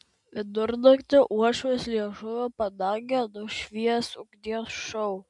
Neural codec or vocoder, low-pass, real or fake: none; 10.8 kHz; real